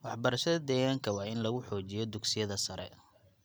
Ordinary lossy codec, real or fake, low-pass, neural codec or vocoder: none; real; none; none